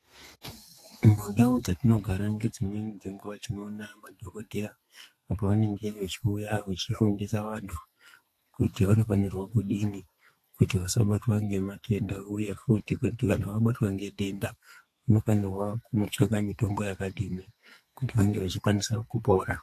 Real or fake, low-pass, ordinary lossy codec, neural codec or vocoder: fake; 14.4 kHz; AAC, 64 kbps; codec, 32 kHz, 1.9 kbps, SNAC